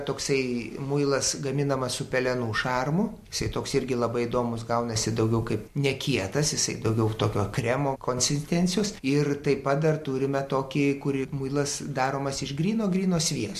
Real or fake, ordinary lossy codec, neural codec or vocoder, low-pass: real; MP3, 64 kbps; none; 14.4 kHz